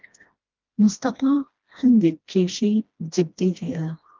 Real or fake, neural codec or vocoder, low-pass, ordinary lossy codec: fake; codec, 16 kHz, 1 kbps, FreqCodec, smaller model; 7.2 kHz; Opus, 24 kbps